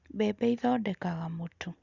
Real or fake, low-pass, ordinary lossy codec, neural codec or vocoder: real; 7.2 kHz; Opus, 64 kbps; none